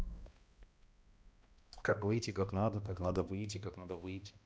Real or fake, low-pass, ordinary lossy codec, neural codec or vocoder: fake; none; none; codec, 16 kHz, 1 kbps, X-Codec, HuBERT features, trained on balanced general audio